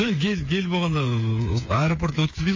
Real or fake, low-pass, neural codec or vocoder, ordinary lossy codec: fake; 7.2 kHz; codec, 16 kHz, 8 kbps, FreqCodec, smaller model; MP3, 32 kbps